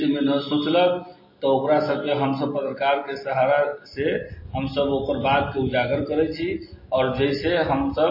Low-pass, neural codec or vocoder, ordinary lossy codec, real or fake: 5.4 kHz; none; MP3, 24 kbps; real